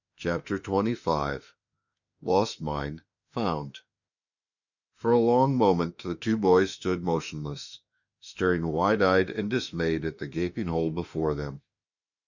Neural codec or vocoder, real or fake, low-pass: autoencoder, 48 kHz, 32 numbers a frame, DAC-VAE, trained on Japanese speech; fake; 7.2 kHz